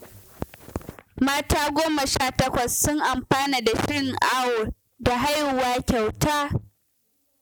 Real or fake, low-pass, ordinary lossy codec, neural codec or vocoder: real; none; none; none